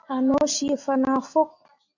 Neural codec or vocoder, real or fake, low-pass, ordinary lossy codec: none; real; 7.2 kHz; AAC, 48 kbps